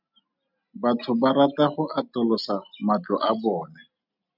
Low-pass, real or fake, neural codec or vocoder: 5.4 kHz; real; none